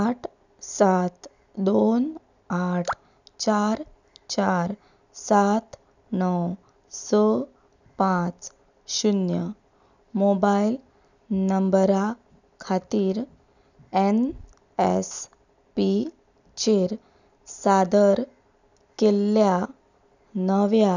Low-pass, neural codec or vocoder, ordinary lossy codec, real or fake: 7.2 kHz; none; none; real